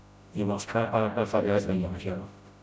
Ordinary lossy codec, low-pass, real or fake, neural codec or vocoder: none; none; fake; codec, 16 kHz, 0.5 kbps, FreqCodec, smaller model